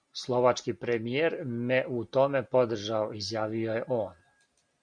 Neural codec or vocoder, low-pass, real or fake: none; 9.9 kHz; real